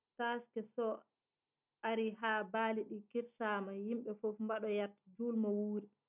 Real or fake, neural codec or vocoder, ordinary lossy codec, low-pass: real; none; none; 3.6 kHz